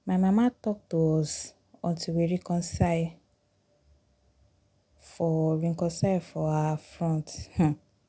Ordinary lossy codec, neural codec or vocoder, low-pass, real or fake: none; none; none; real